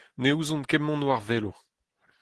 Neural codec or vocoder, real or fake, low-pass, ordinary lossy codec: none; real; 10.8 kHz; Opus, 16 kbps